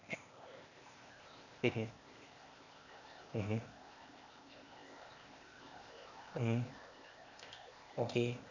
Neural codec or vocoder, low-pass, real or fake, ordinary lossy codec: codec, 16 kHz, 0.8 kbps, ZipCodec; 7.2 kHz; fake; none